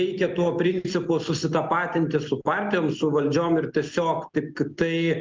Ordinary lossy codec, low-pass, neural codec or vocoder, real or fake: Opus, 24 kbps; 7.2 kHz; none; real